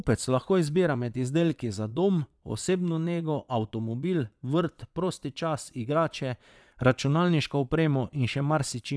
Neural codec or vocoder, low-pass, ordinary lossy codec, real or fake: vocoder, 22.05 kHz, 80 mel bands, Vocos; none; none; fake